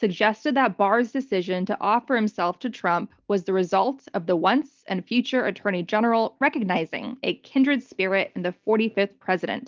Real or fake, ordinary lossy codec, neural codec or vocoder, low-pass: real; Opus, 32 kbps; none; 7.2 kHz